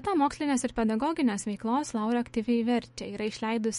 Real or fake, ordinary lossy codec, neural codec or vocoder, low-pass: fake; MP3, 48 kbps; autoencoder, 48 kHz, 128 numbers a frame, DAC-VAE, trained on Japanese speech; 19.8 kHz